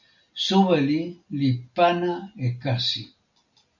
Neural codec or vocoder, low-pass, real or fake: none; 7.2 kHz; real